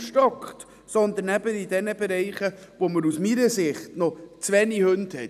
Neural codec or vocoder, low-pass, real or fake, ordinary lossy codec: none; 14.4 kHz; real; none